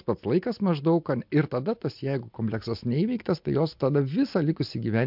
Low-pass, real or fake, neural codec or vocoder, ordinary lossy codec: 5.4 kHz; real; none; MP3, 48 kbps